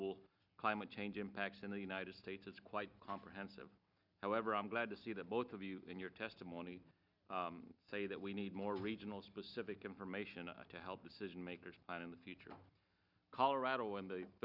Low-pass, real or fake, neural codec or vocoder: 5.4 kHz; real; none